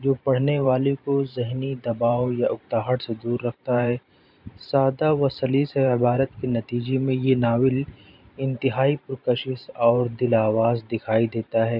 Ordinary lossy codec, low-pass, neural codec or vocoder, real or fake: none; 5.4 kHz; vocoder, 44.1 kHz, 128 mel bands every 512 samples, BigVGAN v2; fake